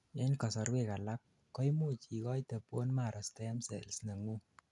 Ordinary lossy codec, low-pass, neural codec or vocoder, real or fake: none; 10.8 kHz; none; real